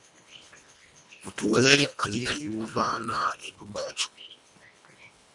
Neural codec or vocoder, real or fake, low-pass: codec, 24 kHz, 1.5 kbps, HILCodec; fake; 10.8 kHz